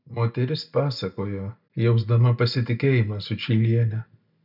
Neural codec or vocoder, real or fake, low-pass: none; real; 5.4 kHz